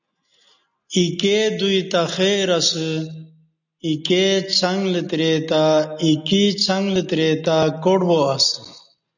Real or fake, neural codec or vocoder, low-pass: real; none; 7.2 kHz